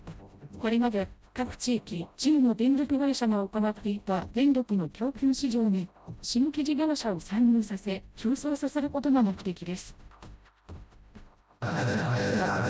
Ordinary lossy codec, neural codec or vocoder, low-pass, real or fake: none; codec, 16 kHz, 0.5 kbps, FreqCodec, smaller model; none; fake